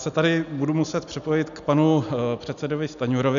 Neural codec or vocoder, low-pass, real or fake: none; 7.2 kHz; real